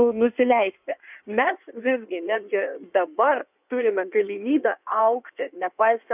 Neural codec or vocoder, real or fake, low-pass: codec, 16 kHz in and 24 kHz out, 1.1 kbps, FireRedTTS-2 codec; fake; 3.6 kHz